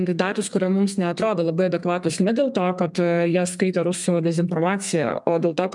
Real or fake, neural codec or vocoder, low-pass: fake; codec, 32 kHz, 1.9 kbps, SNAC; 10.8 kHz